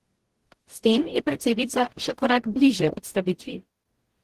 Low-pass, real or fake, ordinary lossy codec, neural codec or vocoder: 14.4 kHz; fake; Opus, 16 kbps; codec, 44.1 kHz, 0.9 kbps, DAC